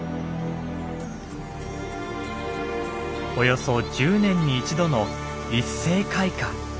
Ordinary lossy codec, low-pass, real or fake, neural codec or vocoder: none; none; real; none